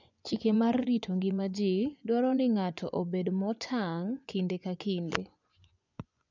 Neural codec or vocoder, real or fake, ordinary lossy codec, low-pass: vocoder, 24 kHz, 100 mel bands, Vocos; fake; none; 7.2 kHz